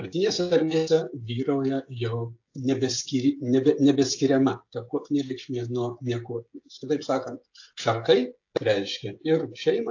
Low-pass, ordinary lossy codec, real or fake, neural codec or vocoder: 7.2 kHz; AAC, 48 kbps; fake; codec, 16 kHz, 16 kbps, FreqCodec, smaller model